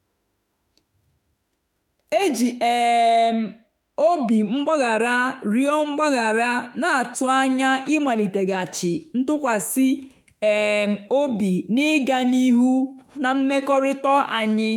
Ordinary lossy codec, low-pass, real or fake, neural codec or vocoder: none; 19.8 kHz; fake; autoencoder, 48 kHz, 32 numbers a frame, DAC-VAE, trained on Japanese speech